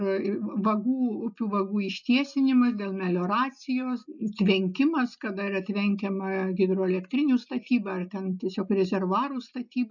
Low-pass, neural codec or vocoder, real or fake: 7.2 kHz; none; real